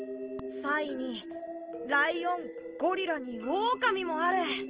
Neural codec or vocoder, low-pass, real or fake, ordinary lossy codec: none; 3.6 kHz; real; Opus, 16 kbps